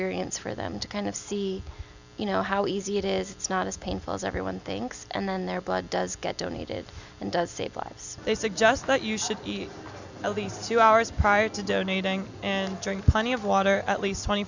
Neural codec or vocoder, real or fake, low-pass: none; real; 7.2 kHz